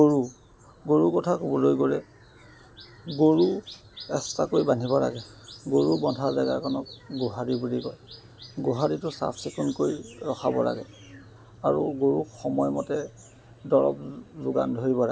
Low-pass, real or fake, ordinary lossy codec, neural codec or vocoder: none; real; none; none